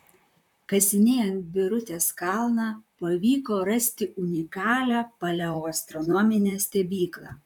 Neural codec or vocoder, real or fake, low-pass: vocoder, 44.1 kHz, 128 mel bands, Pupu-Vocoder; fake; 19.8 kHz